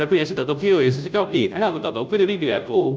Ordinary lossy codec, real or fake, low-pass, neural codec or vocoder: none; fake; none; codec, 16 kHz, 0.5 kbps, FunCodec, trained on Chinese and English, 25 frames a second